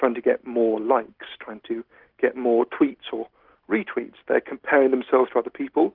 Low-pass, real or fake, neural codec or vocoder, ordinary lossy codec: 5.4 kHz; real; none; Opus, 16 kbps